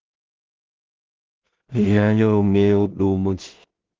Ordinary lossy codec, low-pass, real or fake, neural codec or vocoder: Opus, 16 kbps; 7.2 kHz; fake; codec, 16 kHz in and 24 kHz out, 0.4 kbps, LongCat-Audio-Codec, two codebook decoder